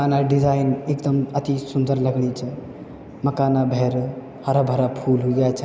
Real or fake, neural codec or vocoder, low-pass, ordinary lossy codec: real; none; none; none